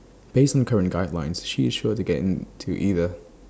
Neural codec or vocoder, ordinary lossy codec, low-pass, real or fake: none; none; none; real